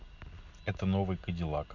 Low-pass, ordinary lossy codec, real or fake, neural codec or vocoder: 7.2 kHz; none; real; none